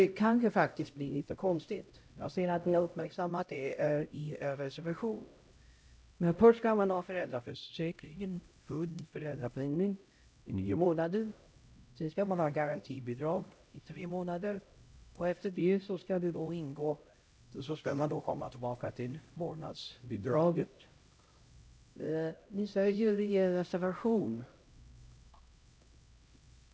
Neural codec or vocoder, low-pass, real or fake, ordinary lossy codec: codec, 16 kHz, 0.5 kbps, X-Codec, HuBERT features, trained on LibriSpeech; none; fake; none